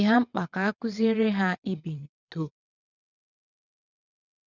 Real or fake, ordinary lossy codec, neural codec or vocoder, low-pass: fake; none; vocoder, 22.05 kHz, 80 mel bands, WaveNeXt; 7.2 kHz